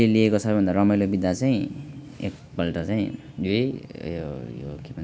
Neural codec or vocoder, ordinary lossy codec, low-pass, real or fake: none; none; none; real